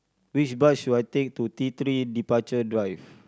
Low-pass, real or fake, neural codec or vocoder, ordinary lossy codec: none; real; none; none